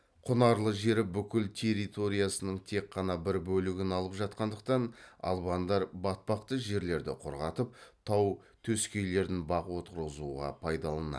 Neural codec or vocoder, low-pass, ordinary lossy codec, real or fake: none; none; none; real